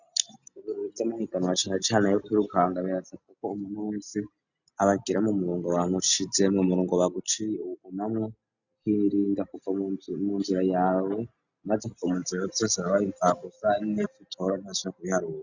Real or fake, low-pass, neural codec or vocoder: real; 7.2 kHz; none